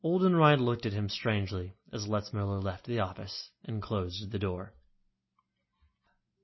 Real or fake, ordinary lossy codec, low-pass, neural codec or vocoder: real; MP3, 24 kbps; 7.2 kHz; none